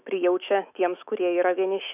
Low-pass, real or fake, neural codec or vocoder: 3.6 kHz; fake; autoencoder, 48 kHz, 128 numbers a frame, DAC-VAE, trained on Japanese speech